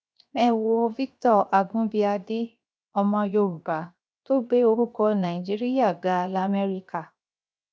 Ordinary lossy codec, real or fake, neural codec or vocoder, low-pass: none; fake; codec, 16 kHz, 0.7 kbps, FocalCodec; none